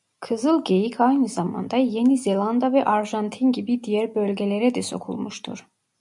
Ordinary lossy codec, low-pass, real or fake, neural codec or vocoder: MP3, 96 kbps; 10.8 kHz; real; none